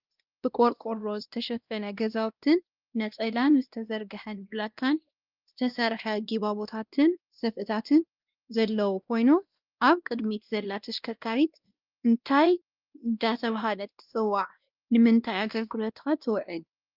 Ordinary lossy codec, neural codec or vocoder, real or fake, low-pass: Opus, 32 kbps; codec, 16 kHz, 1 kbps, X-Codec, WavLM features, trained on Multilingual LibriSpeech; fake; 5.4 kHz